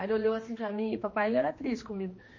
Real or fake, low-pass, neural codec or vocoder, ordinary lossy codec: fake; 7.2 kHz; codec, 16 kHz, 2 kbps, X-Codec, HuBERT features, trained on general audio; MP3, 32 kbps